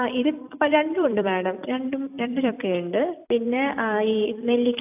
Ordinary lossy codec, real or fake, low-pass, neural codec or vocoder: AAC, 32 kbps; fake; 3.6 kHz; vocoder, 44.1 kHz, 128 mel bands every 256 samples, BigVGAN v2